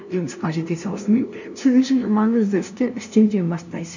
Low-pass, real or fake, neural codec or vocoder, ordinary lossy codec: 7.2 kHz; fake; codec, 16 kHz, 0.5 kbps, FunCodec, trained on LibriTTS, 25 frames a second; none